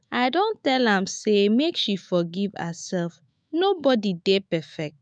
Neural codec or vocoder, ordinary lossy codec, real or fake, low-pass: autoencoder, 48 kHz, 128 numbers a frame, DAC-VAE, trained on Japanese speech; none; fake; 9.9 kHz